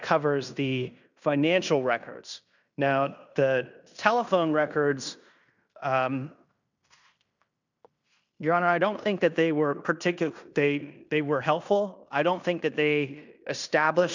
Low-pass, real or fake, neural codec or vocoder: 7.2 kHz; fake; codec, 16 kHz in and 24 kHz out, 0.9 kbps, LongCat-Audio-Codec, fine tuned four codebook decoder